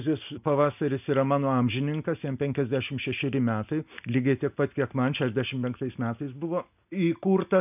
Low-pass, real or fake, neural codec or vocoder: 3.6 kHz; real; none